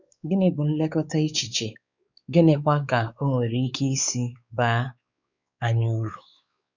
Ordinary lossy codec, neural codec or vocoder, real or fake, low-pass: none; codec, 16 kHz, 4 kbps, X-Codec, WavLM features, trained on Multilingual LibriSpeech; fake; 7.2 kHz